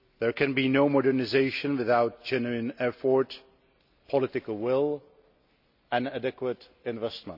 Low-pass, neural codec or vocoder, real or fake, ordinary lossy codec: 5.4 kHz; none; real; none